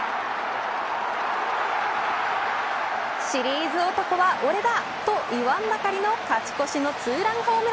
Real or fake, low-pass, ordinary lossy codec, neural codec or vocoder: real; none; none; none